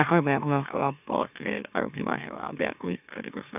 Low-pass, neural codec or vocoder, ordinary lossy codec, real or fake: 3.6 kHz; autoencoder, 44.1 kHz, a latent of 192 numbers a frame, MeloTTS; none; fake